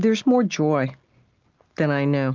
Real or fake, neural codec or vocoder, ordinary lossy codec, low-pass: real; none; Opus, 24 kbps; 7.2 kHz